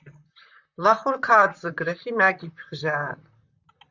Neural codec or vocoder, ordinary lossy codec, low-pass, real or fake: vocoder, 44.1 kHz, 128 mel bands, Pupu-Vocoder; Opus, 64 kbps; 7.2 kHz; fake